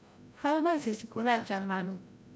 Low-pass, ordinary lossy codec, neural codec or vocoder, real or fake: none; none; codec, 16 kHz, 0.5 kbps, FreqCodec, larger model; fake